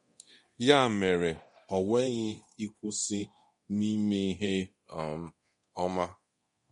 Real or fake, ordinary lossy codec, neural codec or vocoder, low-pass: fake; MP3, 48 kbps; codec, 24 kHz, 0.9 kbps, DualCodec; 10.8 kHz